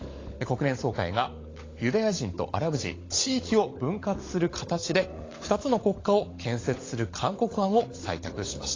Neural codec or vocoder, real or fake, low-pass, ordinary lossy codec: codec, 16 kHz, 16 kbps, FunCodec, trained on LibriTTS, 50 frames a second; fake; 7.2 kHz; AAC, 32 kbps